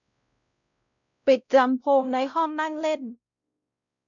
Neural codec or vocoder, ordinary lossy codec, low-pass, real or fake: codec, 16 kHz, 0.5 kbps, X-Codec, WavLM features, trained on Multilingual LibriSpeech; none; 7.2 kHz; fake